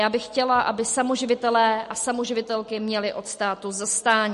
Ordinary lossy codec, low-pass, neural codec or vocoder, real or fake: MP3, 48 kbps; 14.4 kHz; none; real